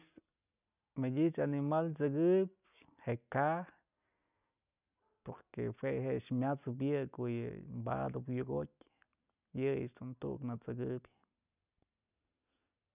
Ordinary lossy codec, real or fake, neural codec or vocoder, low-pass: none; real; none; 3.6 kHz